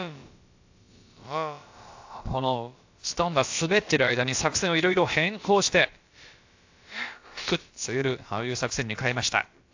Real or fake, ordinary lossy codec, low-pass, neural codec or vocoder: fake; AAC, 48 kbps; 7.2 kHz; codec, 16 kHz, about 1 kbps, DyCAST, with the encoder's durations